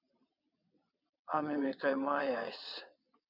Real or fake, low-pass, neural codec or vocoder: fake; 5.4 kHz; vocoder, 22.05 kHz, 80 mel bands, WaveNeXt